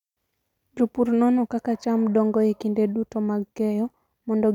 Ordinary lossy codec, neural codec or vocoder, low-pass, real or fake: none; none; 19.8 kHz; real